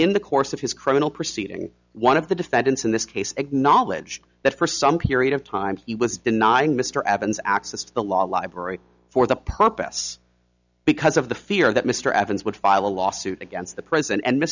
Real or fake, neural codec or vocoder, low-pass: real; none; 7.2 kHz